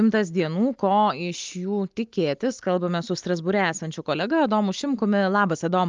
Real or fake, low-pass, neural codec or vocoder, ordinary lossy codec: real; 7.2 kHz; none; Opus, 32 kbps